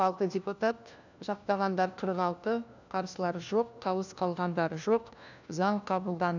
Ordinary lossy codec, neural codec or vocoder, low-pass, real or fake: none; codec, 16 kHz, 1 kbps, FunCodec, trained on LibriTTS, 50 frames a second; 7.2 kHz; fake